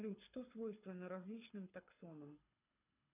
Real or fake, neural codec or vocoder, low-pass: fake; codec, 24 kHz, 6 kbps, HILCodec; 3.6 kHz